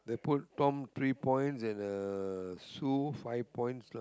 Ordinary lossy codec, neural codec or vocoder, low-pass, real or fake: none; none; none; real